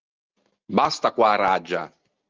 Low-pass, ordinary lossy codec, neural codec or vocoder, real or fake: 7.2 kHz; Opus, 24 kbps; none; real